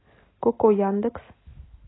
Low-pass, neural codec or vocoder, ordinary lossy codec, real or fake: 7.2 kHz; none; AAC, 16 kbps; real